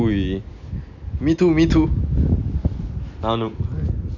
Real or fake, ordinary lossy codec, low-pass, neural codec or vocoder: real; none; 7.2 kHz; none